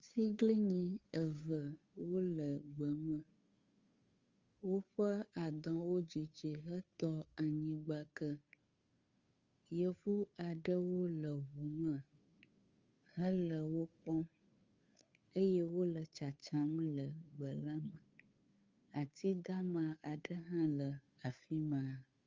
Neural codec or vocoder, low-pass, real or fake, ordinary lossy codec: codec, 16 kHz, 2 kbps, FunCodec, trained on Chinese and English, 25 frames a second; 7.2 kHz; fake; Opus, 32 kbps